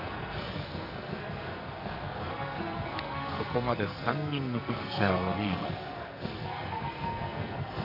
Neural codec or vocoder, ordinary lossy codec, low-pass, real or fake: codec, 44.1 kHz, 2.6 kbps, SNAC; none; 5.4 kHz; fake